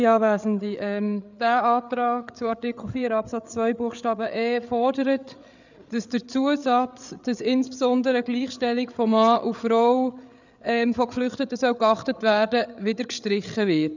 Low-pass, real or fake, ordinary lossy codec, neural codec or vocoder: 7.2 kHz; fake; none; codec, 16 kHz, 8 kbps, FreqCodec, larger model